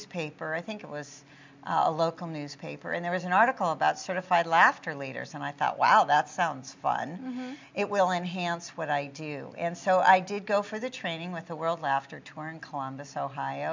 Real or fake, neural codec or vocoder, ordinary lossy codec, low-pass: real; none; MP3, 64 kbps; 7.2 kHz